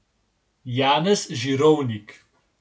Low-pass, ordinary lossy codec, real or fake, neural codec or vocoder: none; none; real; none